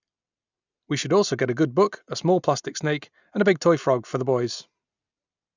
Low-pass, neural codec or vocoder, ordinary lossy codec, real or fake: 7.2 kHz; none; none; real